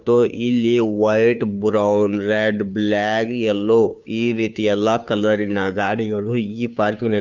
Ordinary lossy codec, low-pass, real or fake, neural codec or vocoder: none; 7.2 kHz; fake; codec, 16 kHz, 2 kbps, FunCodec, trained on Chinese and English, 25 frames a second